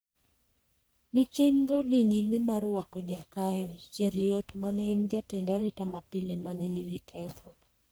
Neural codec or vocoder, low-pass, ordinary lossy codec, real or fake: codec, 44.1 kHz, 1.7 kbps, Pupu-Codec; none; none; fake